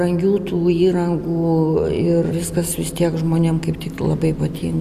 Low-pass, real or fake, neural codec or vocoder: 14.4 kHz; real; none